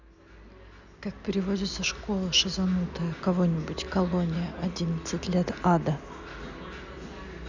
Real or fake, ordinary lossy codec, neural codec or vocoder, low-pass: real; none; none; 7.2 kHz